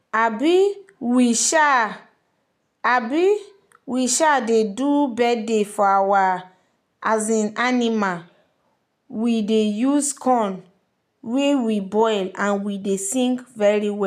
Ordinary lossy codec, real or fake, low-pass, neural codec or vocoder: none; real; 14.4 kHz; none